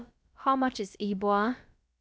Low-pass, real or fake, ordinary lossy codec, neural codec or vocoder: none; fake; none; codec, 16 kHz, about 1 kbps, DyCAST, with the encoder's durations